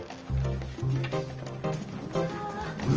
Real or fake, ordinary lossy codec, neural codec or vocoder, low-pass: real; Opus, 24 kbps; none; 7.2 kHz